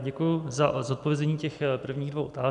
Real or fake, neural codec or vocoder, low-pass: real; none; 10.8 kHz